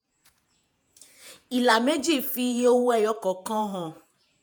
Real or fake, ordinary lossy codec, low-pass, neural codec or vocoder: fake; none; none; vocoder, 48 kHz, 128 mel bands, Vocos